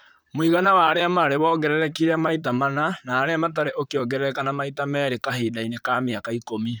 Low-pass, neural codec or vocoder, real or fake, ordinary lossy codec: none; vocoder, 44.1 kHz, 128 mel bands, Pupu-Vocoder; fake; none